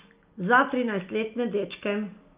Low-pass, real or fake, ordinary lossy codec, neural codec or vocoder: 3.6 kHz; real; Opus, 24 kbps; none